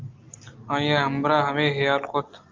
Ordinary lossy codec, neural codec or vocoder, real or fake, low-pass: Opus, 32 kbps; none; real; 7.2 kHz